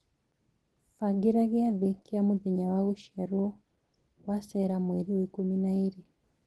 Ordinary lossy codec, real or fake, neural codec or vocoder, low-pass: Opus, 16 kbps; real; none; 10.8 kHz